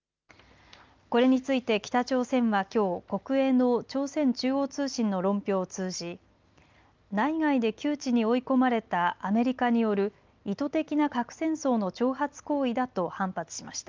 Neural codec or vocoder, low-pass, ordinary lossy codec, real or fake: none; 7.2 kHz; Opus, 24 kbps; real